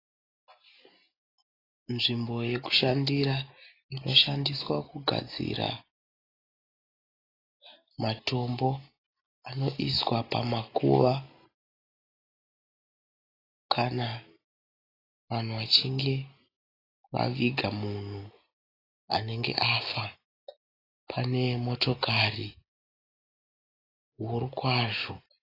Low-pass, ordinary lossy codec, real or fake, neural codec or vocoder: 5.4 kHz; AAC, 24 kbps; real; none